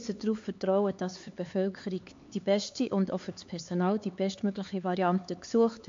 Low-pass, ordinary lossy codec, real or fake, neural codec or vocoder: 7.2 kHz; MP3, 48 kbps; fake; codec, 16 kHz, 4 kbps, X-Codec, HuBERT features, trained on LibriSpeech